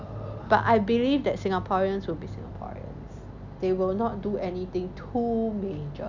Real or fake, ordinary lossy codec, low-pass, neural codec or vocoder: real; none; 7.2 kHz; none